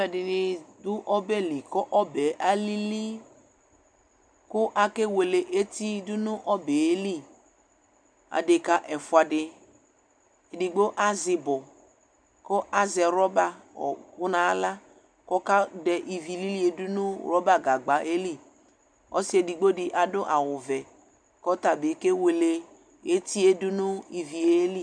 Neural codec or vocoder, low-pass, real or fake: none; 9.9 kHz; real